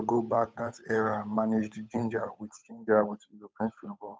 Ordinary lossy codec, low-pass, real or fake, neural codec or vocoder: none; none; fake; codec, 16 kHz, 8 kbps, FunCodec, trained on Chinese and English, 25 frames a second